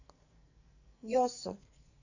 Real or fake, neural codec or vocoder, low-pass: fake; codec, 44.1 kHz, 2.6 kbps, SNAC; 7.2 kHz